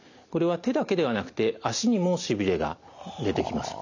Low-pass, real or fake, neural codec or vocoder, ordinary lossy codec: 7.2 kHz; real; none; none